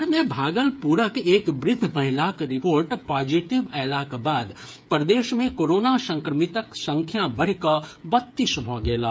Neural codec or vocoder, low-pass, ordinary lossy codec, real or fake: codec, 16 kHz, 8 kbps, FreqCodec, smaller model; none; none; fake